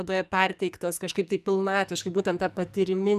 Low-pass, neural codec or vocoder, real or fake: 14.4 kHz; codec, 44.1 kHz, 2.6 kbps, SNAC; fake